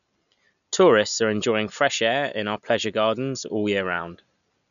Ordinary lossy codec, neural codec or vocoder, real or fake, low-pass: none; none; real; 7.2 kHz